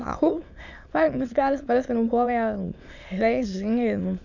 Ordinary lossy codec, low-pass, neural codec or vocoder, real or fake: none; 7.2 kHz; autoencoder, 22.05 kHz, a latent of 192 numbers a frame, VITS, trained on many speakers; fake